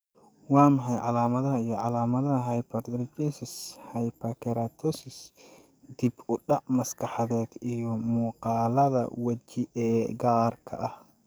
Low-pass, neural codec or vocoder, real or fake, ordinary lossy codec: none; codec, 44.1 kHz, 7.8 kbps, DAC; fake; none